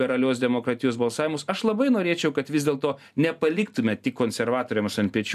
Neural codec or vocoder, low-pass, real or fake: none; 14.4 kHz; real